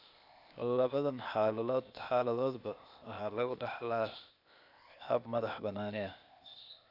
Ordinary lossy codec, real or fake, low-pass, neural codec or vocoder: none; fake; 5.4 kHz; codec, 16 kHz, 0.8 kbps, ZipCodec